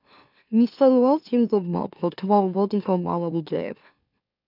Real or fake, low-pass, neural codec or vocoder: fake; 5.4 kHz; autoencoder, 44.1 kHz, a latent of 192 numbers a frame, MeloTTS